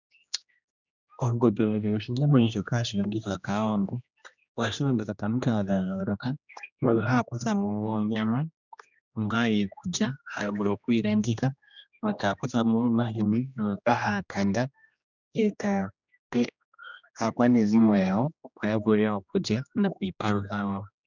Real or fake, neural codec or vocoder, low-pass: fake; codec, 16 kHz, 1 kbps, X-Codec, HuBERT features, trained on general audio; 7.2 kHz